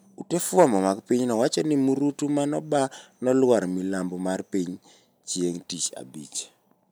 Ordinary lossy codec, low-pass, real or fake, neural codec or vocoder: none; none; real; none